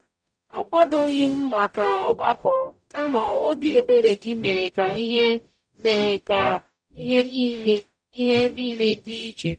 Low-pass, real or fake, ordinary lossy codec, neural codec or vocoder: 9.9 kHz; fake; none; codec, 44.1 kHz, 0.9 kbps, DAC